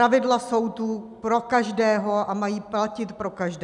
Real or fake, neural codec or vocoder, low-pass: real; none; 10.8 kHz